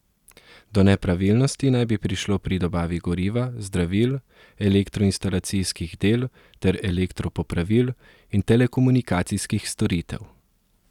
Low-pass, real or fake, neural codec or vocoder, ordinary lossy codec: 19.8 kHz; real; none; none